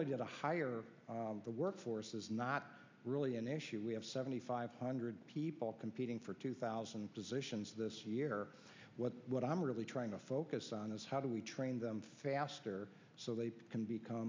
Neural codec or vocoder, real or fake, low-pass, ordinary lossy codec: none; real; 7.2 kHz; MP3, 64 kbps